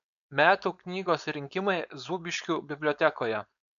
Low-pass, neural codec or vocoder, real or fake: 7.2 kHz; codec, 16 kHz, 4.8 kbps, FACodec; fake